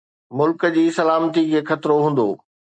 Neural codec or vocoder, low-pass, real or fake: none; 9.9 kHz; real